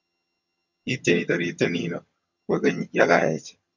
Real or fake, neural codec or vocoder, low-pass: fake; vocoder, 22.05 kHz, 80 mel bands, HiFi-GAN; 7.2 kHz